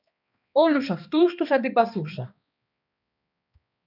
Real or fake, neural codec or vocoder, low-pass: fake; codec, 16 kHz, 2 kbps, X-Codec, HuBERT features, trained on balanced general audio; 5.4 kHz